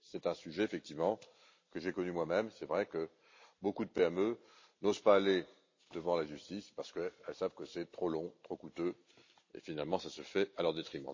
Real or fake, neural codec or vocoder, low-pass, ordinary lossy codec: real; none; 7.2 kHz; MP3, 32 kbps